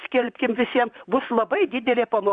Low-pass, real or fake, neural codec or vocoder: 9.9 kHz; fake; vocoder, 44.1 kHz, 128 mel bands, Pupu-Vocoder